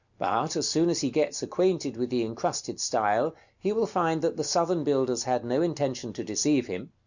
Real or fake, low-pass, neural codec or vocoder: real; 7.2 kHz; none